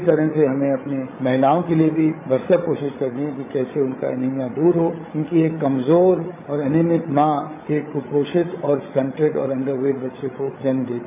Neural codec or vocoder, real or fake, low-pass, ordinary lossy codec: codec, 16 kHz, 16 kbps, FreqCodec, larger model; fake; 3.6 kHz; MP3, 24 kbps